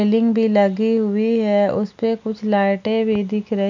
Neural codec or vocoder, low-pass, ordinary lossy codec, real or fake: none; 7.2 kHz; none; real